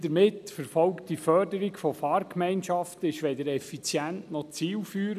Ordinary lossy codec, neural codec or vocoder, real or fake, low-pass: none; none; real; 14.4 kHz